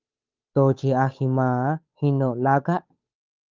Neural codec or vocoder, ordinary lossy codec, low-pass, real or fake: codec, 16 kHz, 8 kbps, FunCodec, trained on Chinese and English, 25 frames a second; Opus, 24 kbps; 7.2 kHz; fake